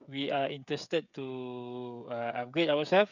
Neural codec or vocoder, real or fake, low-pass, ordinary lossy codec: codec, 16 kHz, 16 kbps, FreqCodec, smaller model; fake; 7.2 kHz; none